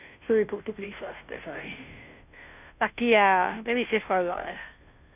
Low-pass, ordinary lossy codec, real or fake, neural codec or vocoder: 3.6 kHz; none; fake; codec, 16 kHz, 0.5 kbps, FunCodec, trained on Chinese and English, 25 frames a second